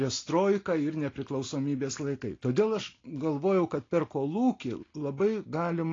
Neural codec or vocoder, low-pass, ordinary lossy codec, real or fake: none; 7.2 kHz; AAC, 32 kbps; real